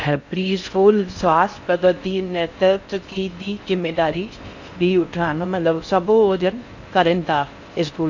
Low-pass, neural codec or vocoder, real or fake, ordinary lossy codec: 7.2 kHz; codec, 16 kHz in and 24 kHz out, 0.6 kbps, FocalCodec, streaming, 4096 codes; fake; none